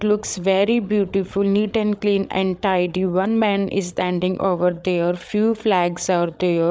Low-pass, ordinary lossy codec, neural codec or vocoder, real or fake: none; none; codec, 16 kHz, 8 kbps, FreqCodec, larger model; fake